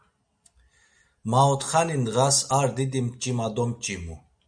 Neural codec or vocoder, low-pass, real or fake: none; 9.9 kHz; real